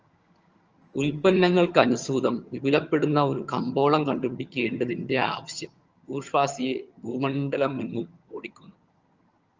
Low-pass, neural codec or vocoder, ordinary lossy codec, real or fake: 7.2 kHz; vocoder, 22.05 kHz, 80 mel bands, HiFi-GAN; Opus, 24 kbps; fake